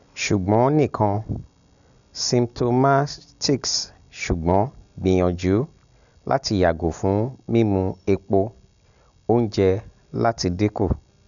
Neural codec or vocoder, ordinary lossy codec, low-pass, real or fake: none; none; 7.2 kHz; real